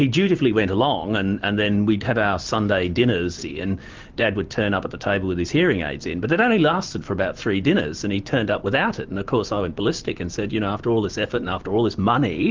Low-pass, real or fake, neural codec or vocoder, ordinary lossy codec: 7.2 kHz; real; none; Opus, 32 kbps